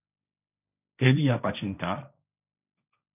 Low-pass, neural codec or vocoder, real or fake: 3.6 kHz; codec, 16 kHz, 1.1 kbps, Voila-Tokenizer; fake